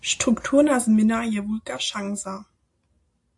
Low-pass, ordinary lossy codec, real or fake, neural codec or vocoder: 10.8 kHz; AAC, 64 kbps; real; none